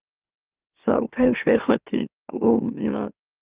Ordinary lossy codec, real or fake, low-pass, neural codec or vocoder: Opus, 32 kbps; fake; 3.6 kHz; autoencoder, 44.1 kHz, a latent of 192 numbers a frame, MeloTTS